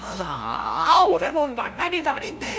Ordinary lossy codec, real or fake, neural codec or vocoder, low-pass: none; fake; codec, 16 kHz, 0.5 kbps, FunCodec, trained on LibriTTS, 25 frames a second; none